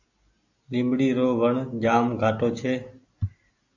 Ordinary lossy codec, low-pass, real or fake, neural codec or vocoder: AAC, 48 kbps; 7.2 kHz; real; none